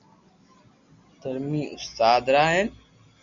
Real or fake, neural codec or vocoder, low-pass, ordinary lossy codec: real; none; 7.2 kHz; Opus, 64 kbps